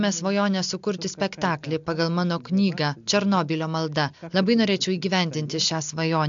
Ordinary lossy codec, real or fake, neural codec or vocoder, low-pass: MP3, 64 kbps; real; none; 7.2 kHz